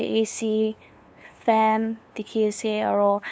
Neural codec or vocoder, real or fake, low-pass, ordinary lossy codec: codec, 16 kHz, 2 kbps, FunCodec, trained on LibriTTS, 25 frames a second; fake; none; none